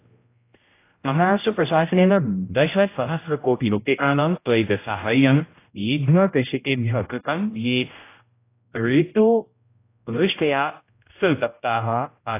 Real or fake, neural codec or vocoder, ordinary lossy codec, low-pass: fake; codec, 16 kHz, 0.5 kbps, X-Codec, HuBERT features, trained on general audio; AAC, 24 kbps; 3.6 kHz